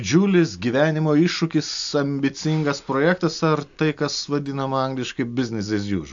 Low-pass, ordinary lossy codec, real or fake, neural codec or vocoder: 7.2 kHz; AAC, 64 kbps; real; none